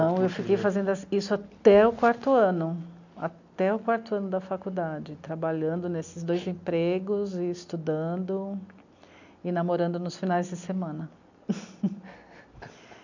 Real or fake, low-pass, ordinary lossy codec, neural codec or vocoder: real; 7.2 kHz; none; none